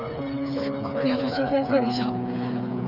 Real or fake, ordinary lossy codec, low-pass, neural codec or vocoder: fake; none; 5.4 kHz; codec, 16 kHz, 4 kbps, FreqCodec, smaller model